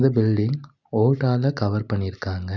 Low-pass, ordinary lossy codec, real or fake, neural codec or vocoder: 7.2 kHz; none; real; none